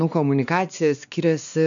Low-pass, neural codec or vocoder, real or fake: 7.2 kHz; codec, 16 kHz, 2 kbps, X-Codec, WavLM features, trained on Multilingual LibriSpeech; fake